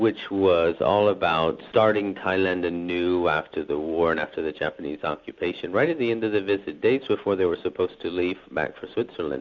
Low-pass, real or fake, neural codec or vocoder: 7.2 kHz; real; none